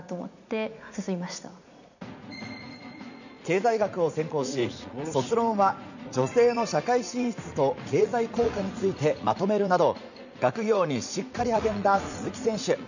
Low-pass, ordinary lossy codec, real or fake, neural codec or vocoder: 7.2 kHz; none; fake; vocoder, 44.1 kHz, 80 mel bands, Vocos